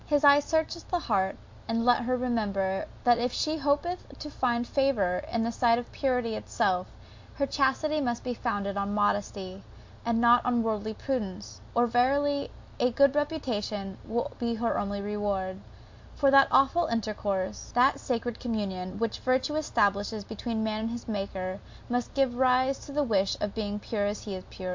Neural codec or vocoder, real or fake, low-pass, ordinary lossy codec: none; real; 7.2 kHz; MP3, 48 kbps